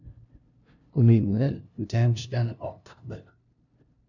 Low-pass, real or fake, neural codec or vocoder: 7.2 kHz; fake; codec, 16 kHz, 0.5 kbps, FunCodec, trained on LibriTTS, 25 frames a second